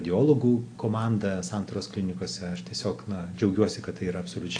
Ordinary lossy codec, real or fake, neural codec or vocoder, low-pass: AAC, 48 kbps; real; none; 9.9 kHz